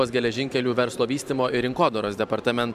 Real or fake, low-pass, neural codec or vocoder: fake; 14.4 kHz; vocoder, 44.1 kHz, 128 mel bands every 512 samples, BigVGAN v2